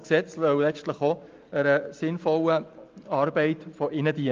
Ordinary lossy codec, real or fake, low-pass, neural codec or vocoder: Opus, 32 kbps; real; 7.2 kHz; none